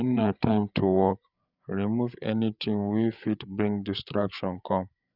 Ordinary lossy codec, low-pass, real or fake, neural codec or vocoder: none; 5.4 kHz; fake; codec, 16 kHz, 8 kbps, FreqCodec, larger model